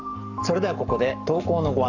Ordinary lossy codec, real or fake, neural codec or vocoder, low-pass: none; fake; codec, 44.1 kHz, 7.8 kbps, Pupu-Codec; 7.2 kHz